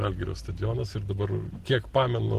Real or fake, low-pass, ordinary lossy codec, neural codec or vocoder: real; 14.4 kHz; Opus, 16 kbps; none